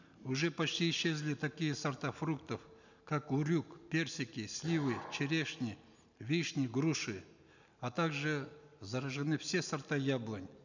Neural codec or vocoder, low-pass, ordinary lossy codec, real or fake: none; 7.2 kHz; none; real